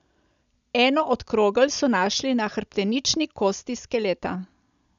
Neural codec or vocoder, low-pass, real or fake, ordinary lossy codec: none; 7.2 kHz; real; none